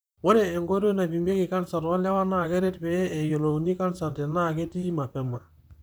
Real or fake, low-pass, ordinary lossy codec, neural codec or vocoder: fake; none; none; vocoder, 44.1 kHz, 128 mel bands, Pupu-Vocoder